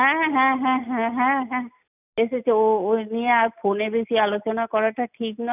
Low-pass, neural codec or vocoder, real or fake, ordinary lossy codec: 3.6 kHz; none; real; none